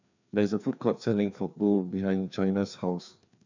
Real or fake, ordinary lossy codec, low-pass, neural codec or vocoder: fake; none; 7.2 kHz; codec, 16 kHz, 2 kbps, FreqCodec, larger model